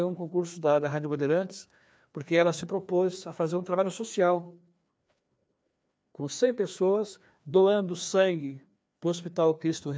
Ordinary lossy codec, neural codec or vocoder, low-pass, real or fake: none; codec, 16 kHz, 2 kbps, FreqCodec, larger model; none; fake